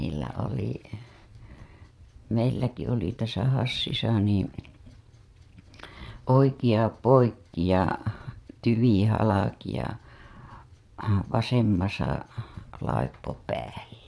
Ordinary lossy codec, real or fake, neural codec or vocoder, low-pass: none; fake; vocoder, 22.05 kHz, 80 mel bands, Vocos; none